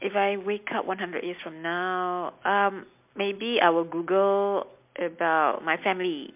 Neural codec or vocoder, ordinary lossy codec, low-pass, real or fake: none; MP3, 32 kbps; 3.6 kHz; real